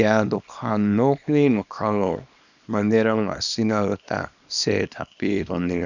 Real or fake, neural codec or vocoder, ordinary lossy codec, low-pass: fake; codec, 24 kHz, 0.9 kbps, WavTokenizer, small release; none; 7.2 kHz